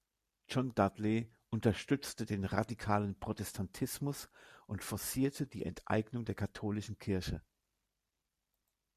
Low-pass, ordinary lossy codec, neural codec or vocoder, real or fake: 14.4 kHz; MP3, 96 kbps; none; real